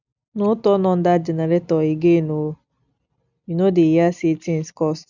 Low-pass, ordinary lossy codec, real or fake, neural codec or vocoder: 7.2 kHz; none; real; none